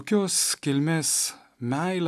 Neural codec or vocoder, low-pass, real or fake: none; 14.4 kHz; real